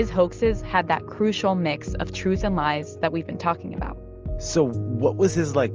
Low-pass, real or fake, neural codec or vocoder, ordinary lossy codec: 7.2 kHz; real; none; Opus, 24 kbps